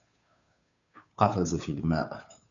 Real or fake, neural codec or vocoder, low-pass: fake; codec, 16 kHz, 2 kbps, FunCodec, trained on Chinese and English, 25 frames a second; 7.2 kHz